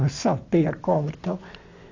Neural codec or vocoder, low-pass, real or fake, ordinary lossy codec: none; 7.2 kHz; real; AAC, 48 kbps